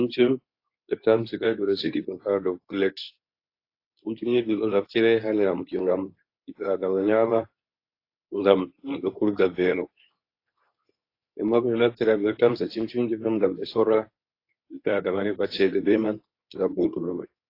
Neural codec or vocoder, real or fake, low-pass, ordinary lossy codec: codec, 24 kHz, 0.9 kbps, WavTokenizer, medium speech release version 2; fake; 5.4 kHz; AAC, 32 kbps